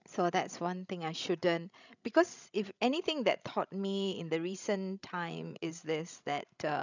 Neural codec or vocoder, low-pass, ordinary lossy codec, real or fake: codec, 16 kHz, 16 kbps, FreqCodec, larger model; 7.2 kHz; none; fake